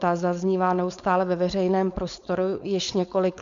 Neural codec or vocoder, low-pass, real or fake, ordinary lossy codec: codec, 16 kHz, 4.8 kbps, FACodec; 7.2 kHz; fake; Opus, 64 kbps